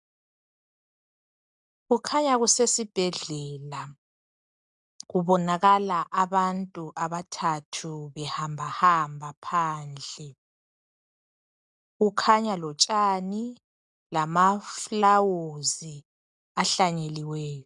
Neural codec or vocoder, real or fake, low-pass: none; real; 10.8 kHz